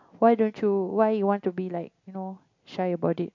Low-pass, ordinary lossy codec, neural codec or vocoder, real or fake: 7.2 kHz; MP3, 48 kbps; none; real